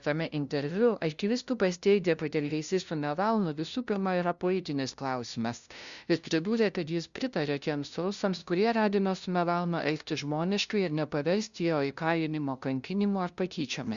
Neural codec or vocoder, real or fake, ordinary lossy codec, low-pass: codec, 16 kHz, 0.5 kbps, FunCodec, trained on LibriTTS, 25 frames a second; fake; Opus, 64 kbps; 7.2 kHz